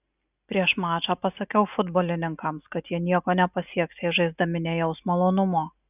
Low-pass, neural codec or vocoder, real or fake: 3.6 kHz; none; real